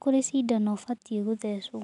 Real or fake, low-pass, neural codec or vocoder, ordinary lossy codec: real; 10.8 kHz; none; none